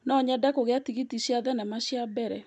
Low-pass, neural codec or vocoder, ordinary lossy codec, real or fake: none; none; none; real